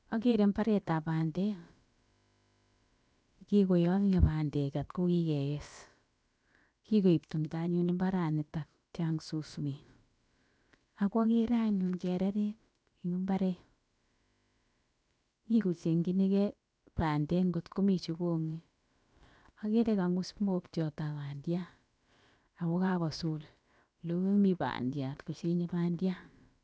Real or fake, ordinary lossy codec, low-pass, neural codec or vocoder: fake; none; none; codec, 16 kHz, about 1 kbps, DyCAST, with the encoder's durations